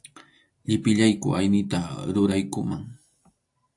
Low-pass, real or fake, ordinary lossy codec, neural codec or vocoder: 10.8 kHz; real; AAC, 64 kbps; none